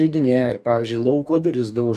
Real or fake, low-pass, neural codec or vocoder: fake; 14.4 kHz; codec, 44.1 kHz, 2.6 kbps, DAC